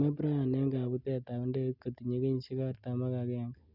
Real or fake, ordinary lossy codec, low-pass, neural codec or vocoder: real; none; 5.4 kHz; none